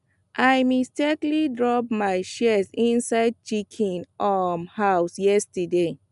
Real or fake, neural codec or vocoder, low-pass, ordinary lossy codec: real; none; 10.8 kHz; none